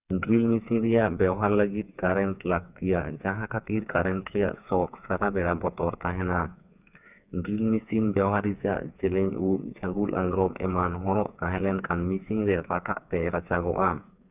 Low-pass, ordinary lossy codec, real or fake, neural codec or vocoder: 3.6 kHz; none; fake; codec, 16 kHz, 4 kbps, FreqCodec, smaller model